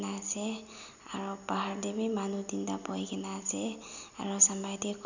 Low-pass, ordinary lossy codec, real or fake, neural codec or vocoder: 7.2 kHz; none; real; none